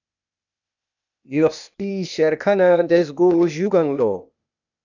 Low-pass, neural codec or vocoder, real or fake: 7.2 kHz; codec, 16 kHz, 0.8 kbps, ZipCodec; fake